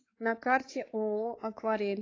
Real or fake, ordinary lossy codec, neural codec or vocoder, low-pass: fake; AAC, 32 kbps; codec, 16 kHz, 4 kbps, X-Codec, WavLM features, trained on Multilingual LibriSpeech; 7.2 kHz